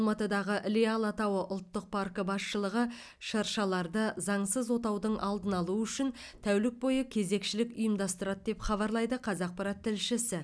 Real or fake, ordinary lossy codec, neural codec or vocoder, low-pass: real; none; none; none